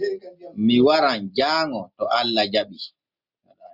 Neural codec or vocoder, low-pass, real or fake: none; 5.4 kHz; real